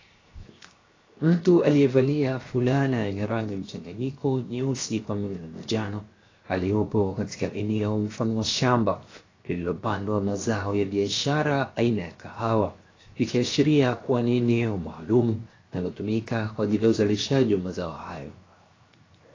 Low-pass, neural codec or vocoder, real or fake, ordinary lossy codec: 7.2 kHz; codec, 16 kHz, 0.7 kbps, FocalCodec; fake; AAC, 32 kbps